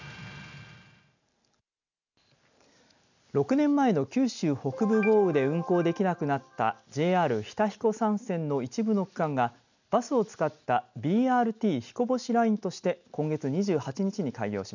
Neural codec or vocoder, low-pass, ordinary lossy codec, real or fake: none; 7.2 kHz; none; real